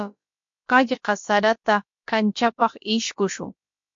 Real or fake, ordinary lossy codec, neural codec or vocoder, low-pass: fake; MP3, 48 kbps; codec, 16 kHz, about 1 kbps, DyCAST, with the encoder's durations; 7.2 kHz